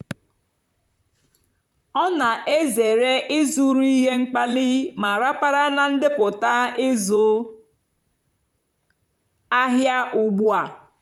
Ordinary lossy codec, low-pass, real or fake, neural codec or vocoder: none; 19.8 kHz; fake; vocoder, 44.1 kHz, 128 mel bands, Pupu-Vocoder